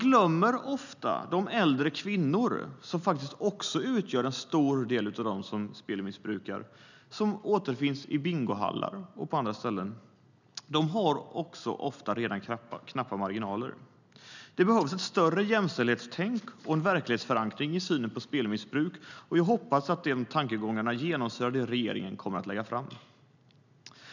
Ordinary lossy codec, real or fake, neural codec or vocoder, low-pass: none; real; none; 7.2 kHz